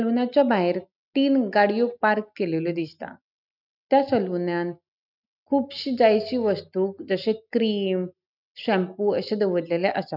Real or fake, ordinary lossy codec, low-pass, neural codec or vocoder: real; none; 5.4 kHz; none